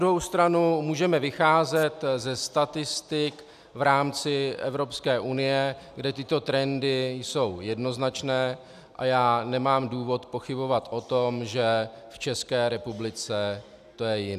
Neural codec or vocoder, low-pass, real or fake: none; 14.4 kHz; real